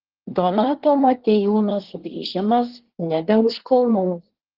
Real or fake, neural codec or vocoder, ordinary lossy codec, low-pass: fake; codec, 24 kHz, 1 kbps, SNAC; Opus, 16 kbps; 5.4 kHz